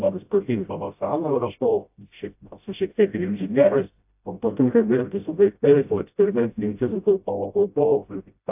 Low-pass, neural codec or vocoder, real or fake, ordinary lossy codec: 3.6 kHz; codec, 16 kHz, 0.5 kbps, FreqCodec, smaller model; fake; AAC, 32 kbps